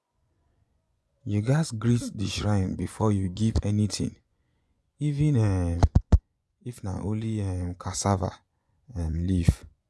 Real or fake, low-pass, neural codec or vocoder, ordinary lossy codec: fake; none; vocoder, 24 kHz, 100 mel bands, Vocos; none